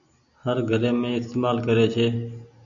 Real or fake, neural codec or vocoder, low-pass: real; none; 7.2 kHz